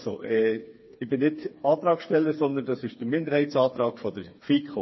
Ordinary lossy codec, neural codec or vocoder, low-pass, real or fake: MP3, 24 kbps; codec, 16 kHz, 4 kbps, FreqCodec, smaller model; 7.2 kHz; fake